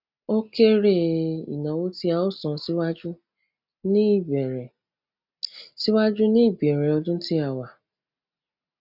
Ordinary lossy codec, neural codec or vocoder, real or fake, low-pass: none; none; real; 5.4 kHz